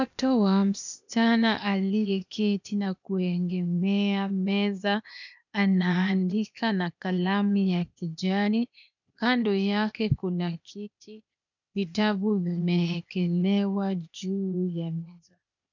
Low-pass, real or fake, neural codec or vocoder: 7.2 kHz; fake; codec, 16 kHz, 0.8 kbps, ZipCodec